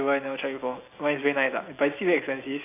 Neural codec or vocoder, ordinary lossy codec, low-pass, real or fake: none; AAC, 24 kbps; 3.6 kHz; real